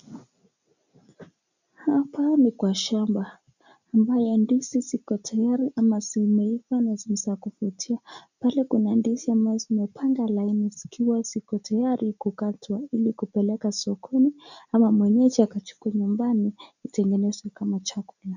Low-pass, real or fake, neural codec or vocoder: 7.2 kHz; real; none